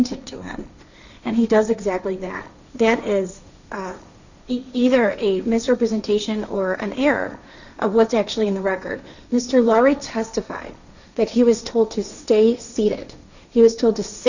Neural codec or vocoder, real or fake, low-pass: codec, 16 kHz, 1.1 kbps, Voila-Tokenizer; fake; 7.2 kHz